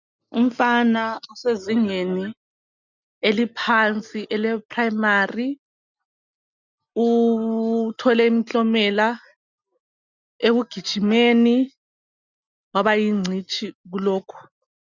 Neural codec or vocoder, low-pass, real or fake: none; 7.2 kHz; real